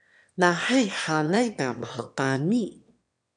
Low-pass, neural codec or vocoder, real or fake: 9.9 kHz; autoencoder, 22.05 kHz, a latent of 192 numbers a frame, VITS, trained on one speaker; fake